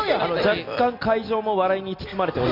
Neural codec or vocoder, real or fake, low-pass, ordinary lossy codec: none; real; 5.4 kHz; none